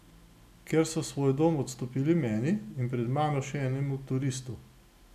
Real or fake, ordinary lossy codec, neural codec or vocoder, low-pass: real; none; none; 14.4 kHz